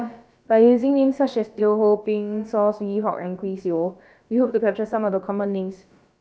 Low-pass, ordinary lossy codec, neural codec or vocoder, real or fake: none; none; codec, 16 kHz, about 1 kbps, DyCAST, with the encoder's durations; fake